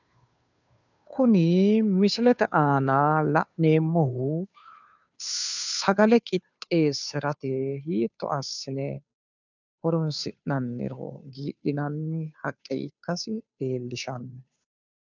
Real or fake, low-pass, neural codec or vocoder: fake; 7.2 kHz; codec, 16 kHz, 2 kbps, FunCodec, trained on Chinese and English, 25 frames a second